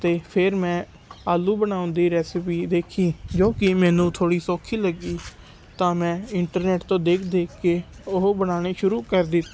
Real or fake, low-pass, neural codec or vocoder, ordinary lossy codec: real; none; none; none